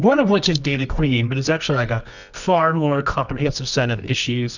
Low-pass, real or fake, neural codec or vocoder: 7.2 kHz; fake; codec, 24 kHz, 0.9 kbps, WavTokenizer, medium music audio release